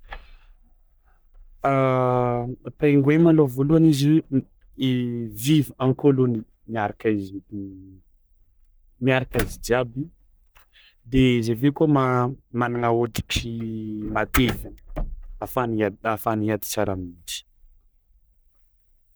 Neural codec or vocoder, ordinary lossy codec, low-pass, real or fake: codec, 44.1 kHz, 3.4 kbps, Pupu-Codec; none; none; fake